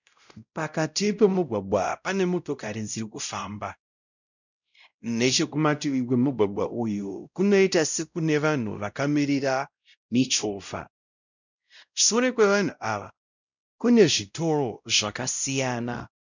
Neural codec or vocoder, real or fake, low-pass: codec, 16 kHz, 0.5 kbps, X-Codec, WavLM features, trained on Multilingual LibriSpeech; fake; 7.2 kHz